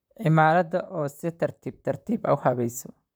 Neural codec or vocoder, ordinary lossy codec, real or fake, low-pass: vocoder, 44.1 kHz, 128 mel bands, Pupu-Vocoder; none; fake; none